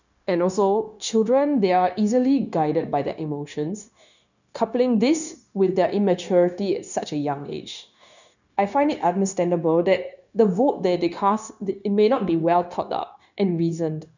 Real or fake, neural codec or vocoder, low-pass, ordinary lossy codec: fake; codec, 16 kHz, 0.9 kbps, LongCat-Audio-Codec; 7.2 kHz; none